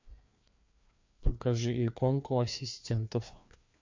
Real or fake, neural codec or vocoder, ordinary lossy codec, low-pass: fake; codec, 16 kHz, 2 kbps, FreqCodec, larger model; MP3, 48 kbps; 7.2 kHz